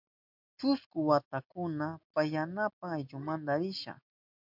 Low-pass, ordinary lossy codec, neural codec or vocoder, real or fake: 5.4 kHz; MP3, 48 kbps; none; real